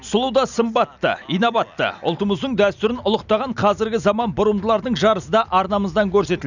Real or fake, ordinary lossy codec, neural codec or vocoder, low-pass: real; none; none; 7.2 kHz